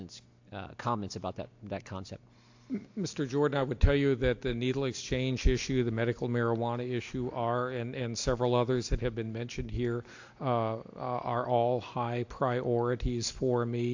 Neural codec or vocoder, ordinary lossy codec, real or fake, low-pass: none; AAC, 48 kbps; real; 7.2 kHz